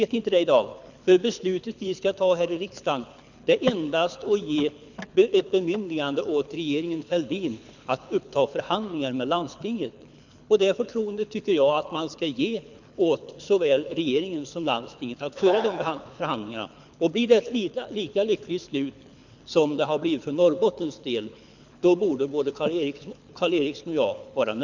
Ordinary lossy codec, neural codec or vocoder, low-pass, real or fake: none; codec, 24 kHz, 6 kbps, HILCodec; 7.2 kHz; fake